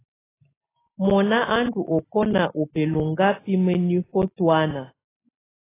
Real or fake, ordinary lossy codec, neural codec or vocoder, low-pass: real; AAC, 16 kbps; none; 3.6 kHz